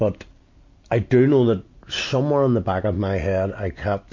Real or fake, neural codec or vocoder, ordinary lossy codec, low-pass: real; none; MP3, 48 kbps; 7.2 kHz